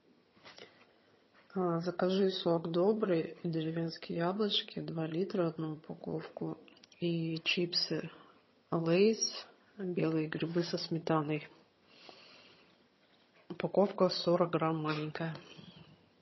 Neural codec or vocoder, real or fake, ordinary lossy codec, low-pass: vocoder, 22.05 kHz, 80 mel bands, HiFi-GAN; fake; MP3, 24 kbps; 7.2 kHz